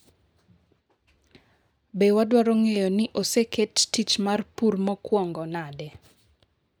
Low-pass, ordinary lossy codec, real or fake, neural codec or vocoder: none; none; real; none